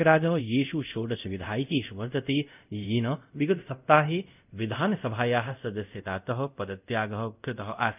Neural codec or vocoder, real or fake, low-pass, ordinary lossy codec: codec, 24 kHz, 0.5 kbps, DualCodec; fake; 3.6 kHz; none